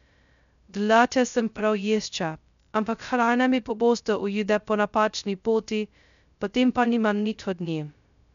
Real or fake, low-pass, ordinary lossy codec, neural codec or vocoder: fake; 7.2 kHz; none; codec, 16 kHz, 0.2 kbps, FocalCodec